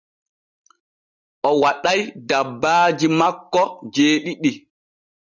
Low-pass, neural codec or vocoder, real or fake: 7.2 kHz; none; real